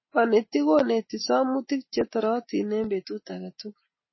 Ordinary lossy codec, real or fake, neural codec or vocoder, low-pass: MP3, 24 kbps; real; none; 7.2 kHz